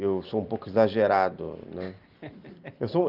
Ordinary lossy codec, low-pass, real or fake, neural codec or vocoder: Opus, 32 kbps; 5.4 kHz; real; none